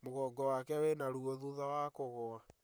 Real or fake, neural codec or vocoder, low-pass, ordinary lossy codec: fake; vocoder, 44.1 kHz, 128 mel bands, Pupu-Vocoder; none; none